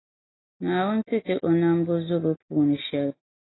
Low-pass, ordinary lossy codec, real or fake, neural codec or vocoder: 7.2 kHz; AAC, 16 kbps; real; none